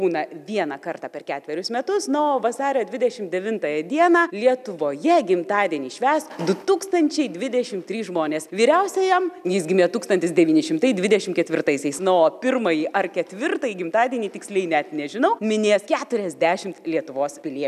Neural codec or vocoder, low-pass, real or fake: none; 14.4 kHz; real